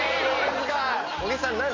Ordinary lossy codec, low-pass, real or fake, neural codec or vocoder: MP3, 48 kbps; 7.2 kHz; real; none